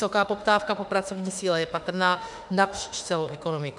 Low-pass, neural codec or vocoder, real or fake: 10.8 kHz; autoencoder, 48 kHz, 32 numbers a frame, DAC-VAE, trained on Japanese speech; fake